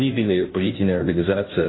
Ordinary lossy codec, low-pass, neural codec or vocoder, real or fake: AAC, 16 kbps; 7.2 kHz; codec, 16 kHz, 0.5 kbps, FunCodec, trained on Chinese and English, 25 frames a second; fake